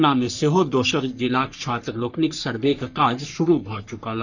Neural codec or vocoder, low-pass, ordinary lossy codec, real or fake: codec, 44.1 kHz, 3.4 kbps, Pupu-Codec; 7.2 kHz; none; fake